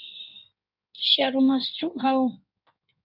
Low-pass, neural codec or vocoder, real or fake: 5.4 kHz; codec, 16 kHz in and 24 kHz out, 1.1 kbps, FireRedTTS-2 codec; fake